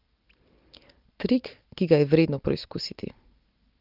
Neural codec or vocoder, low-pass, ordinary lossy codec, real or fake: none; 5.4 kHz; Opus, 32 kbps; real